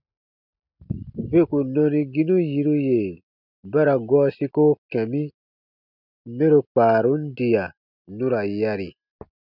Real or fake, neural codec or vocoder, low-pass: real; none; 5.4 kHz